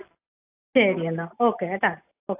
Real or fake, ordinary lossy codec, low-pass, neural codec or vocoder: real; AAC, 32 kbps; 3.6 kHz; none